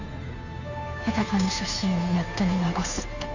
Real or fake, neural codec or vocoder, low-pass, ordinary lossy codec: fake; codec, 16 kHz, 2 kbps, FunCodec, trained on Chinese and English, 25 frames a second; 7.2 kHz; none